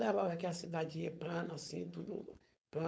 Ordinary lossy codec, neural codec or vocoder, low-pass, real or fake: none; codec, 16 kHz, 4.8 kbps, FACodec; none; fake